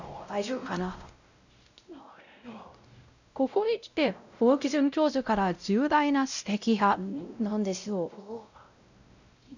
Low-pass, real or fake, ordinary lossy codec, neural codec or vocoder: 7.2 kHz; fake; none; codec, 16 kHz, 0.5 kbps, X-Codec, WavLM features, trained on Multilingual LibriSpeech